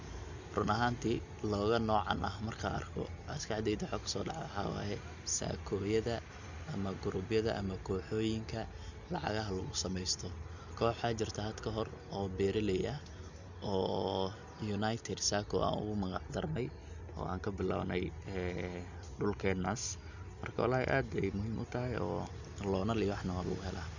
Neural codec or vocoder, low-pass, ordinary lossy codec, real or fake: none; 7.2 kHz; none; real